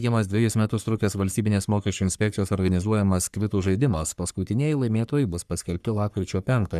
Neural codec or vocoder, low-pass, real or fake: codec, 44.1 kHz, 3.4 kbps, Pupu-Codec; 14.4 kHz; fake